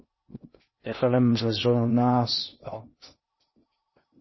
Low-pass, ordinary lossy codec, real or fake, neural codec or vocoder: 7.2 kHz; MP3, 24 kbps; fake; codec, 16 kHz in and 24 kHz out, 0.6 kbps, FocalCodec, streaming, 2048 codes